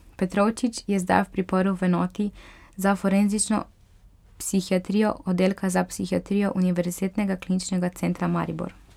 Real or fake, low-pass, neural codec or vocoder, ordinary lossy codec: real; 19.8 kHz; none; none